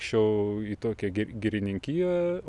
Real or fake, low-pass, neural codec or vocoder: real; 10.8 kHz; none